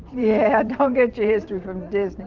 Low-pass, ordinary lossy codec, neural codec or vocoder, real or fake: 7.2 kHz; Opus, 32 kbps; vocoder, 44.1 kHz, 128 mel bands every 512 samples, BigVGAN v2; fake